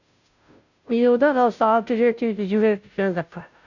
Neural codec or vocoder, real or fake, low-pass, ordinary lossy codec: codec, 16 kHz, 0.5 kbps, FunCodec, trained on Chinese and English, 25 frames a second; fake; 7.2 kHz; none